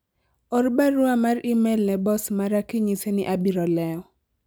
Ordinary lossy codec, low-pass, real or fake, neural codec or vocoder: none; none; real; none